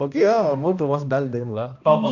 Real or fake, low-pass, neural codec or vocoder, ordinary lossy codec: fake; 7.2 kHz; codec, 16 kHz, 1 kbps, X-Codec, HuBERT features, trained on balanced general audio; none